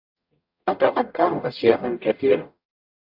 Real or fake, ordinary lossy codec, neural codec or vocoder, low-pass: fake; AAC, 48 kbps; codec, 44.1 kHz, 0.9 kbps, DAC; 5.4 kHz